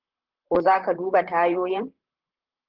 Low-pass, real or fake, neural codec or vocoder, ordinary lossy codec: 5.4 kHz; fake; vocoder, 44.1 kHz, 128 mel bands, Pupu-Vocoder; Opus, 16 kbps